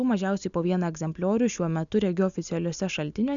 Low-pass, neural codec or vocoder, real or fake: 7.2 kHz; none; real